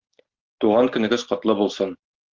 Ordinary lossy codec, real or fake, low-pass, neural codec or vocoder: Opus, 16 kbps; real; 7.2 kHz; none